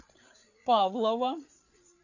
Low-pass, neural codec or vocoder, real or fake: 7.2 kHz; codec, 16 kHz, 8 kbps, FreqCodec, larger model; fake